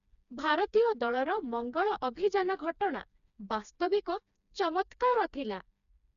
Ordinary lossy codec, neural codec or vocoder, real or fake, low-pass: none; codec, 16 kHz, 2 kbps, FreqCodec, smaller model; fake; 7.2 kHz